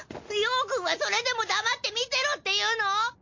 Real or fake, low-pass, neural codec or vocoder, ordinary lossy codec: real; 7.2 kHz; none; MP3, 48 kbps